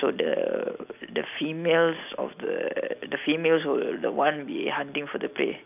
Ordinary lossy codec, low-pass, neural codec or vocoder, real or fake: none; 3.6 kHz; none; real